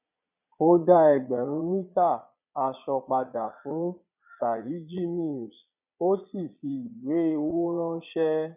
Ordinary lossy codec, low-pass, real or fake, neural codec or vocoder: none; 3.6 kHz; fake; vocoder, 22.05 kHz, 80 mel bands, Vocos